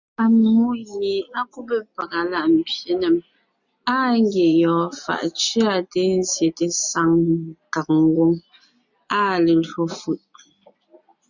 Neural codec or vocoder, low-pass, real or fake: none; 7.2 kHz; real